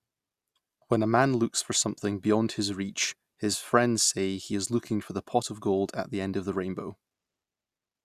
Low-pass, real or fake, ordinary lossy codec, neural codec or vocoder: 14.4 kHz; real; Opus, 64 kbps; none